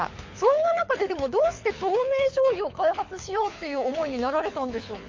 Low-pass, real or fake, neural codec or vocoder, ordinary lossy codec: 7.2 kHz; fake; codec, 24 kHz, 6 kbps, HILCodec; MP3, 48 kbps